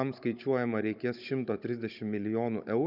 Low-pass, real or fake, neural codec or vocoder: 5.4 kHz; fake; codec, 16 kHz, 16 kbps, FunCodec, trained on Chinese and English, 50 frames a second